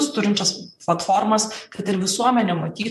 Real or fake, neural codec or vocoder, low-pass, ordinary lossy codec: fake; vocoder, 44.1 kHz, 128 mel bands, Pupu-Vocoder; 14.4 kHz; MP3, 64 kbps